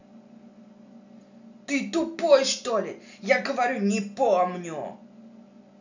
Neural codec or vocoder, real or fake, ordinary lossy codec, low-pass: none; real; none; 7.2 kHz